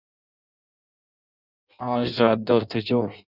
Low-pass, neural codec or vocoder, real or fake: 5.4 kHz; codec, 16 kHz in and 24 kHz out, 0.6 kbps, FireRedTTS-2 codec; fake